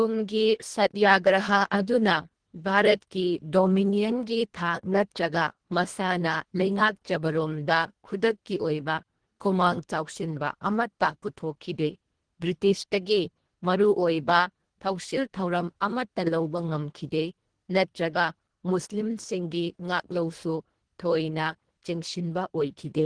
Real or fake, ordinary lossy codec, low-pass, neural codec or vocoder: fake; Opus, 16 kbps; 9.9 kHz; codec, 24 kHz, 1.5 kbps, HILCodec